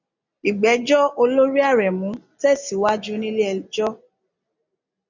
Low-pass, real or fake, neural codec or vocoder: 7.2 kHz; real; none